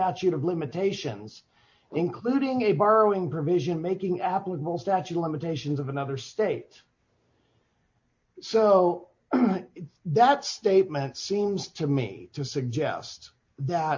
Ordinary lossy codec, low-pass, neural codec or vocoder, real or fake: MP3, 48 kbps; 7.2 kHz; none; real